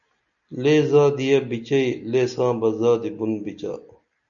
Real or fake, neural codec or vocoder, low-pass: real; none; 7.2 kHz